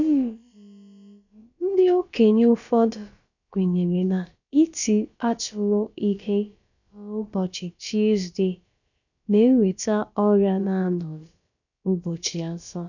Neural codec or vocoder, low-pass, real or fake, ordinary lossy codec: codec, 16 kHz, about 1 kbps, DyCAST, with the encoder's durations; 7.2 kHz; fake; none